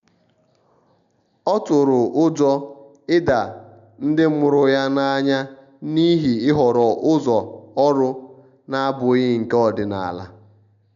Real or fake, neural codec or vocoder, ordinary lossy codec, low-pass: real; none; none; 7.2 kHz